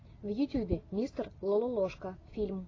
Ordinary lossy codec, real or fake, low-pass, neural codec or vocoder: AAC, 32 kbps; real; 7.2 kHz; none